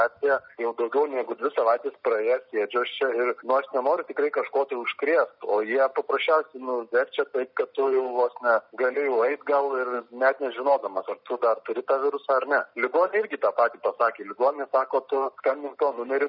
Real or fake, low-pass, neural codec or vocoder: real; 5.4 kHz; none